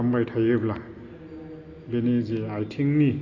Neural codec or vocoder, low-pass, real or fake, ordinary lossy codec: none; 7.2 kHz; real; AAC, 32 kbps